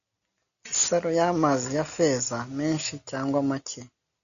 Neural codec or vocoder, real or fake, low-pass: none; real; 7.2 kHz